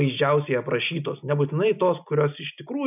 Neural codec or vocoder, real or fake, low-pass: none; real; 3.6 kHz